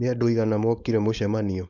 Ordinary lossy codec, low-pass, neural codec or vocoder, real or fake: none; 7.2 kHz; codec, 16 kHz, 4.8 kbps, FACodec; fake